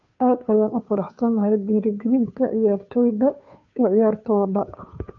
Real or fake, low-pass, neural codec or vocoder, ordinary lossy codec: fake; 7.2 kHz; codec, 16 kHz, 2 kbps, FunCodec, trained on Chinese and English, 25 frames a second; none